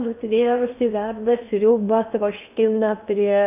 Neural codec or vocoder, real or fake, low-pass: codec, 16 kHz in and 24 kHz out, 0.8 kbps, FocalCodec, streaming, 65536 codes; fake; 3.6 kHz